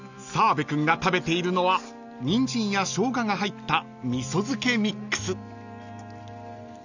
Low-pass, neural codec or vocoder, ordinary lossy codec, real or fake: 7.2 kHz; none; none; real